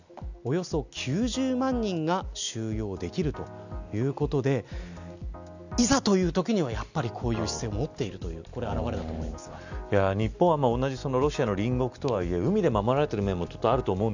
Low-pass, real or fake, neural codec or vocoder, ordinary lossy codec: 7.2 kHz; real; none; none